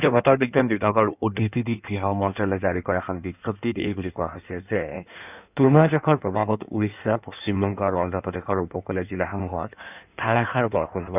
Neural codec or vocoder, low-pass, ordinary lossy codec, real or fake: codec, 16 kHz in and 24 kHz out, 1.1 kbps, FireRedTTS-2 codec; 3.6 kHz; none; fake